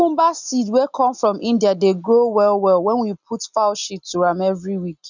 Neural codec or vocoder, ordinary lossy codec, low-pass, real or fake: none; none; 7.2 kHz; real